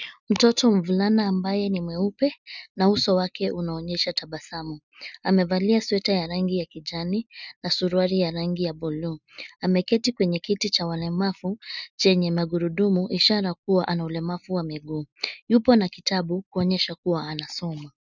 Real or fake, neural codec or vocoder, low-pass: real; none; 7.2 kHz